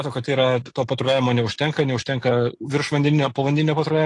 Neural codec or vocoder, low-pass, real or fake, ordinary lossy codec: none; 10.8 kHz; real; AAC, 48 kbps